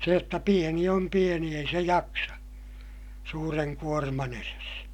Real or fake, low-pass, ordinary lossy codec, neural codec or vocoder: real; 19.8 kHz; none; none